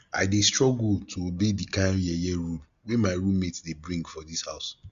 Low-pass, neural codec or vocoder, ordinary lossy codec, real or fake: 7.2 kHz; none; Opus, 64 kbps; real